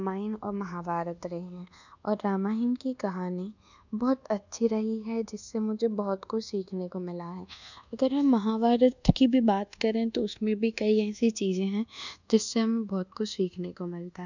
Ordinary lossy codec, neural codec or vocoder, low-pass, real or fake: none; codec, 24 kHz, 1.2 kbps, DualCodec; 7.2 kHz; fake